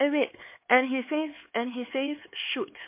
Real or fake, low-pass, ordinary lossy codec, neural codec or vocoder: fake; 3.6 kHz; MP3, 24 kbps; codec, 16 kHz, 4 kbps, X-Codec, HuBERT features, trained on LibriSpeech